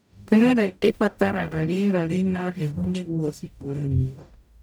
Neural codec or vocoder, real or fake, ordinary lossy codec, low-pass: codec, 44.1 kHz, 0.9 kbps, DAC; fake; none; none